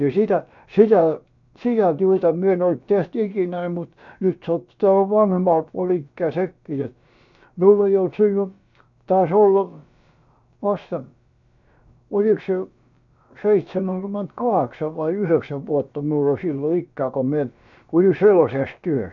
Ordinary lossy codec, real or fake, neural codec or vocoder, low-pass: none; fake; codec, 16 kHz, about 1 kbps, DyCAST, with the encoder's durations; 7.2 kHz